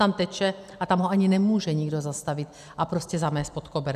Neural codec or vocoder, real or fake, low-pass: none; real; 14.4 kHz